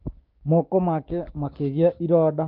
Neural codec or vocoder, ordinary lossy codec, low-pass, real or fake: codec, 16 kHz, 6 kbps, DAC; Opus, 32 kbps; 5.4 kHz; fake